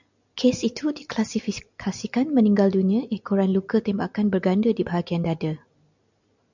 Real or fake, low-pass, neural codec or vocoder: real; 7.2 kHz; none